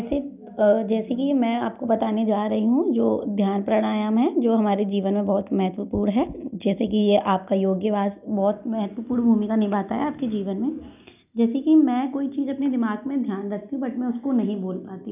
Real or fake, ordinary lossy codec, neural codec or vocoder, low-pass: real; none; none; 3.6 kHz